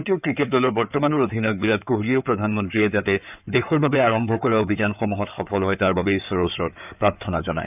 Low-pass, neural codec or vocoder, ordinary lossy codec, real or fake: 3.6 kHz; codec, 16 kHz in and 24 kHz out, 2.2 kbps, FireRedTTS-2 codec; none; fake